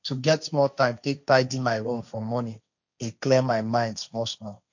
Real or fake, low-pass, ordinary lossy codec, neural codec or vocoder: fake; 7.2 kHz; none; codec, 16 kHz, 1.1 kbps, Voila-Tokenizer